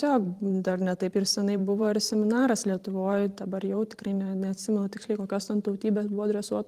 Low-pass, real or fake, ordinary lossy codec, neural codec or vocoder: 14.4 kHz; fake; Opus, 24 kbps; vocoder, 44.1 kHz, 128 mel bands every 512 samples, BigVGAN v2